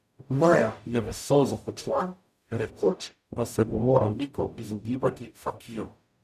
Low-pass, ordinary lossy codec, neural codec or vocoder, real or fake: 14.4 kHz; none; codec, 44.1 kHz, 0.9 kbps, DAC; fake